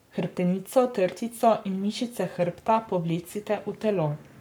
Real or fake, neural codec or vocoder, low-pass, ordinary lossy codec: fake; codec, 44.1 kHz, 7.8 kbps, Pupu-Codec; none; none